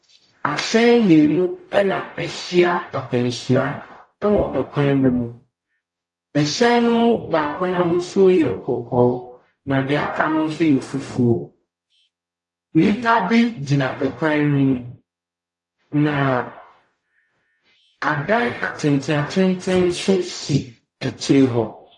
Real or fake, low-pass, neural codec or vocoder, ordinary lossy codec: fake; 10.8 kHz; codec, 44.1 kHz, 0.9 kbps, DAC; AAC, 48 kbps